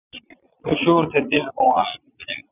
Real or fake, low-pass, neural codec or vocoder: fake; 3.6 kHz; vocoder, 22.05 kHz, 80 mel bands, Vocos